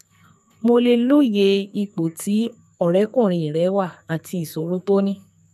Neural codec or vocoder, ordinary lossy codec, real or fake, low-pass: codec, 44.1 kHz, 2.6 kbps, SNAC; none; fake; 14.4 kHz